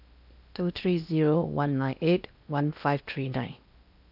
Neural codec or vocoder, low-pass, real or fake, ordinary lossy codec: codec, 16 kHz in and 24 kHz out, 0.8 kbps, FocalCodec, streaming, 65536 codes; 5.4 kHz; fake; none